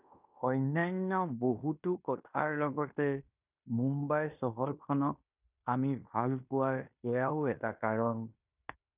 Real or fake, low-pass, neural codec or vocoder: fake; 3.6 kHz; codec, 16 kHz in and 24 kHz out, 0.9 kbps, LongCat-Audio-Codec, fine tuned four codebook decoder